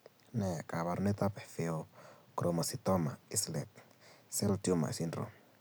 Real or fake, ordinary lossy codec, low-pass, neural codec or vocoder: real; none; none; none